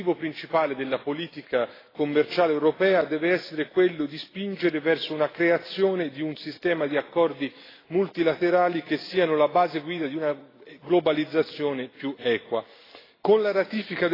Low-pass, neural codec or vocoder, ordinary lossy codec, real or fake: 5.4 kHz; none; AAC, 24 kbps; real